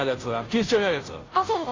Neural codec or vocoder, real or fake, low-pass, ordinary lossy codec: codec, 16 kHz, 0.5 kbps, FunCodec, trained on Chinese and English, 25 frames a second; fake; 7.2 kHz; AAC, 32 kbps